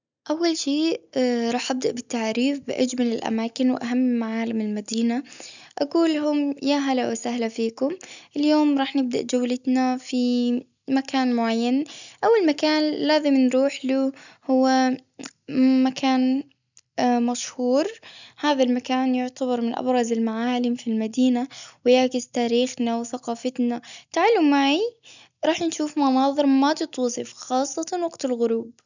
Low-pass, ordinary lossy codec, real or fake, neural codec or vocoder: 7.2 kHz; none; real; none